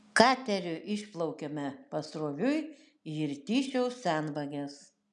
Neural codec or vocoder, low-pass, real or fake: none; 10.8 kHz; real